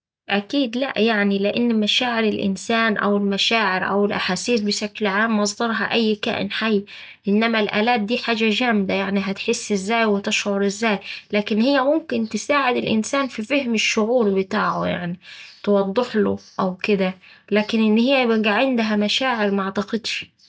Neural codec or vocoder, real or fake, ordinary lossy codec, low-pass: none; real; none; none